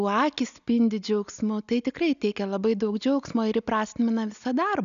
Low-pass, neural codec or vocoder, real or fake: 7.2 kHz; none; real